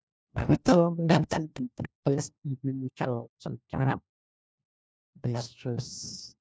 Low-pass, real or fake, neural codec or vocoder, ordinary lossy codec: none; fake; codec, 16 kHz, 1 kbps, FunCodec, trained on LibriTTS, 50 frames a second; none